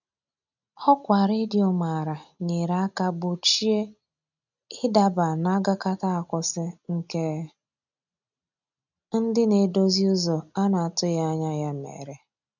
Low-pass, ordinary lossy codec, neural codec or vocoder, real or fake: 7.2 kHz; none; none; real